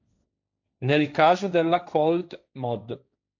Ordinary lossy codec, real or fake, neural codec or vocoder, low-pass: MP3, 64 kbps; fake; codec, 16 kHz, 1.1 kbps, Voila-Tokenizer; 7.2 kHz